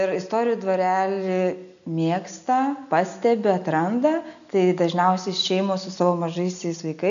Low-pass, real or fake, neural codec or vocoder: 7.2 kHz; real; none